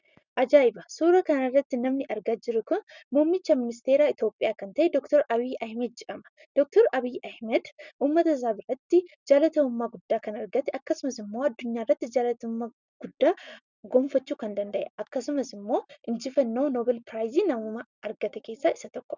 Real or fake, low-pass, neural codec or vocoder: real; 7.2 kHz; none